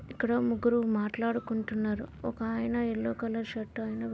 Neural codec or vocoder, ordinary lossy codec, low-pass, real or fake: none; none; none; real